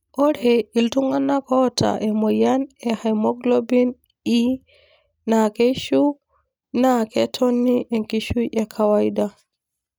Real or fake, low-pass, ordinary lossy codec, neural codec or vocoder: real; none; none; none